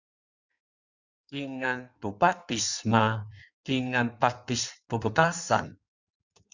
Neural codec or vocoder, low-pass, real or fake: codec, 16 kHz in and 24 kHz out, 1.1 kbps, FireRedTTS-2 codec; 7.2 kHz; fake